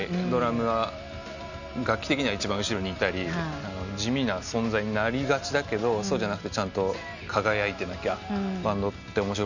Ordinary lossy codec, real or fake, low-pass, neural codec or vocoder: none; real; 7.2 kHz; none